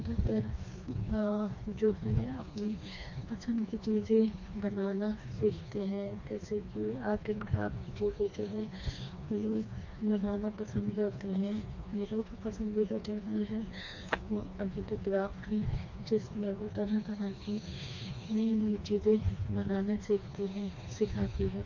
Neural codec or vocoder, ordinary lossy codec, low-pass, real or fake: codec, 16 kHz, 2 kbps, FreqCodec, smaller model; MP3, 48 kbps; 7.2 kHz; fake